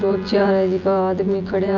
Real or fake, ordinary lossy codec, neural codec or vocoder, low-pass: fake; none; vocoder, 24 kHz, 100 mel bands, Vocos; 7.2 kHz